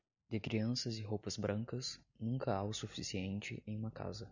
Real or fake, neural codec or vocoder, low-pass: real; none; 7.2 kHz